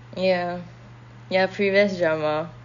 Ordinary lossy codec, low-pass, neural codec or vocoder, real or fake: none; 7.2 kHz; none; real